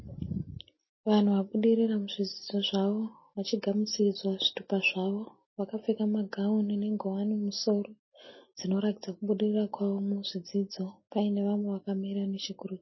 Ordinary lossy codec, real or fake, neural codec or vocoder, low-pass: MP3, 24 kbps; real; none; 7.2 kHz